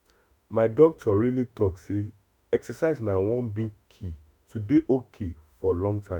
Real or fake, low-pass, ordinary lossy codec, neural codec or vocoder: fake; 19.8 kHz; none; autoencoder, 48 kHz, 32 numbers a frame, DAC-VAE, trained on Japanese speech